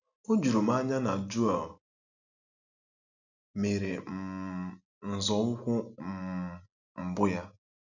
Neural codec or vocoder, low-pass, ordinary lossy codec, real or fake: none; 7.2 kHz; none; real